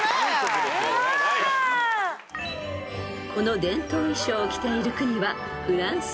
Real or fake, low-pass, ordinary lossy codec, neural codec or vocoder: real; none; none; none